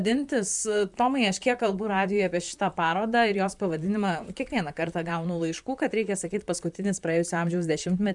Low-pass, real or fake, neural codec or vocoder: 10.8 kHz; fake; vocoder, 44.1 kHz, 128 mel bands, Pupu-Vocoder